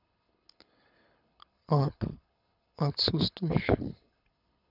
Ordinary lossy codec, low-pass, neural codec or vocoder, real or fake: none; 5.4 kHz; codec, 24 kHz, 6 kbps, HILCodec; fake